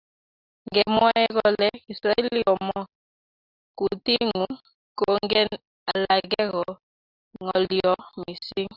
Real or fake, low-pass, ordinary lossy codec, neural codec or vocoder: real; 5.4 kHz; Opus, 64 kbps; none